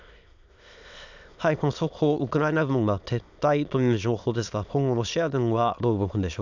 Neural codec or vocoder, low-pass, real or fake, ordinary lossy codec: autoencoder, 22.05 kHz, a latent of 192 numbers a frame, VITS, trained on many speakers; 7.2 kHz; fake; none